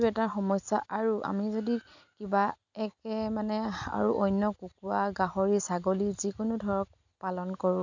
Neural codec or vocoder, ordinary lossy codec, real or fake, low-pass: none; none; real; 7.2 kHz